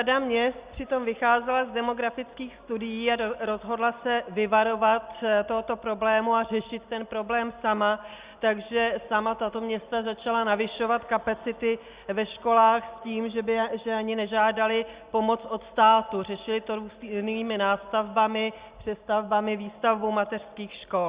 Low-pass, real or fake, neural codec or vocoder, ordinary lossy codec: 3.6 kHz; real; none; Opus, 64 kbps